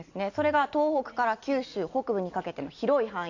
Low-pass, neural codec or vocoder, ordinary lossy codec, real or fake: 7.2 kHz; none; AAC, 48 kbps; real